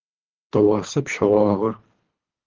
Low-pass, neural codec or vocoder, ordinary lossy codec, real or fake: 7.2 kHz; codec, 24 kHz, 3 kbps, HILCodec; Opus, 16 kbps; fake